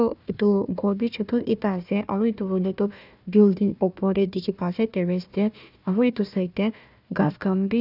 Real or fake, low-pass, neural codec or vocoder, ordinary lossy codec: fake; 5.4 kHz; codec, 16 kHz, 1 kbps, FunCodec, trained on Chinese and English, 50 frames a second; none